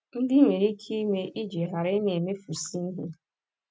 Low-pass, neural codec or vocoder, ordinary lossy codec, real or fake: none; none; none; real